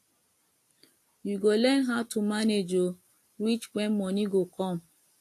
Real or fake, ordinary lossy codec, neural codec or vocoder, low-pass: real; AAC, 64 kbps; none; 14.4 kHz